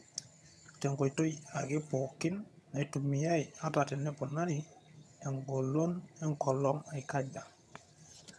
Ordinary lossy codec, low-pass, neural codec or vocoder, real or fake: none; none; vocoder, 22.05 kHz, 80 mel bands, HiFi-GAN; fake